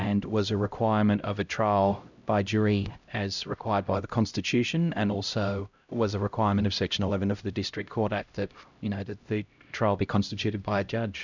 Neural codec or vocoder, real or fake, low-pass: codec, 16 kHz, 0.5 kbps, X-Codec, HuBERT features, trained on LibriSpeech; fake; 7.2 kHz